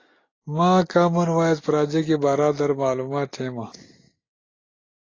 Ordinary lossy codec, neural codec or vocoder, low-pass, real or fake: AAC, 32 kbps; none; 7.2 kHz; real